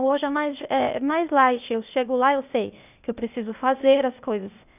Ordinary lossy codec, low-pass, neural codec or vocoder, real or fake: none; 3.6 kHz; codec, 16 kHz, 0.8 kbps, ZipCodec; fake